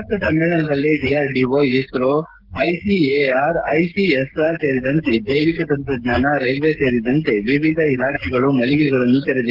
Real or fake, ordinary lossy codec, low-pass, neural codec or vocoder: fake; Opus, 16 kbps; 5.4 kHz; codec, 16 kHz, 16 kbps, FreqCodec, smaller model